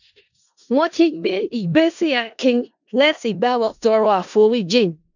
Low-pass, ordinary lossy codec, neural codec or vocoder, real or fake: 7.2 kHz; none; codec, 16 kHz in and 24 kHz out, 0.4 kbps, LongCat-Audio-Codec, four codebook decoder; fake